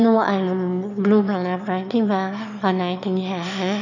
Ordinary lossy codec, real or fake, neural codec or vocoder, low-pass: none; fake; autoencoder, 22.05 kHz, a latent of 192 numbers a frame, VITS, trained on one speaker; 7.2 kHz